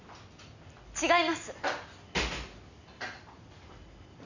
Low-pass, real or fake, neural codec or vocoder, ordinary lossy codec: 7.2 kHz; real; none; none